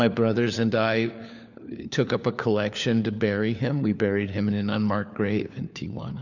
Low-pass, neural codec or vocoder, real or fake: 7.2 kHz; codec, 16 kHz, 4 kbps, FunCodec, trained on LibriTTS, 50 frames a second; fake